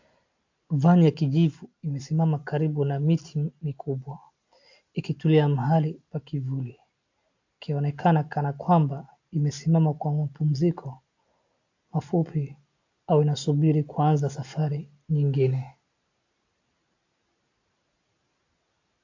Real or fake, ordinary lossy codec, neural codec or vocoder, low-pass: real; AAC, 48 kbps; none; 7.2 kHz